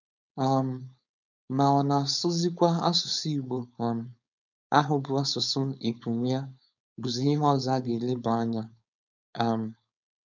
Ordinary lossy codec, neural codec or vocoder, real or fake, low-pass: none; codec, 16 kHz, 4.8 kbps, FACodec; fake; 7.2 kHz